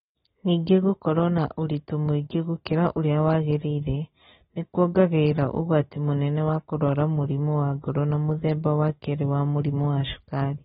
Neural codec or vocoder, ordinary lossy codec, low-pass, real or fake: none; AAC, 16 kbps; 7.2 kHz; real